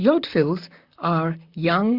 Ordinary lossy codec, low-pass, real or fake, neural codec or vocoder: Opus, 64 kbps; 5.4 kHz; fake; vocoder, 44.1 kHz, 128 mel bands, Pupu-Vocoder